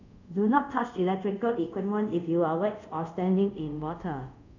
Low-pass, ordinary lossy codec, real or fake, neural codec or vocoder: 7.2 kHz; none; fake; codec, 24 kHz, 0.5 kbps, DualCodec